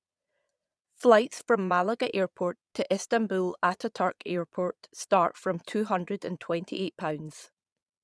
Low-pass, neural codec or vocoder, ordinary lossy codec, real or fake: none; vocoder, 22.05 kHz, 80 mel bands, Vocos; none; fake